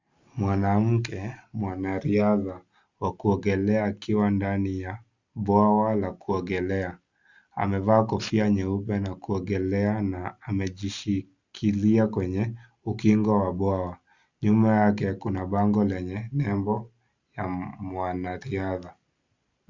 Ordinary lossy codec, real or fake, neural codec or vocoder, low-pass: Opus, 64 kbps; real; none; 7.2 kHz